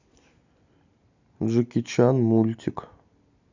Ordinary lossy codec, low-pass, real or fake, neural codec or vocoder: none; 7.2 kHz; real; none